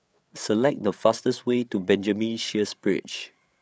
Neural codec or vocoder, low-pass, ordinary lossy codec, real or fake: codec, 16 kHz, 8 kbps, FreqCodec, larger model; none; none; fake